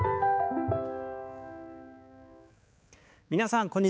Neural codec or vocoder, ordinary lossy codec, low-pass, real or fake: codec, 16 kHz, 4 kbps, X-Codec, HuBERT features, trained on balanced general audio; none; none; fake